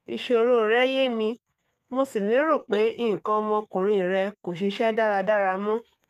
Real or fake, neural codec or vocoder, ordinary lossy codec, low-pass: fake; codec, 32 kHz, 1.9 kbps, SNAC; none; 14.4 kHz